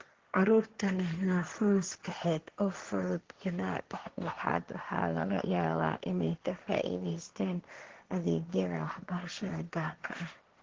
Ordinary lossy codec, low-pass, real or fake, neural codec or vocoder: Opus, 16 kbps; 7.2 kHz; fake; codec, 16 kHz, 1.1 kbps, Voila-Tokenizer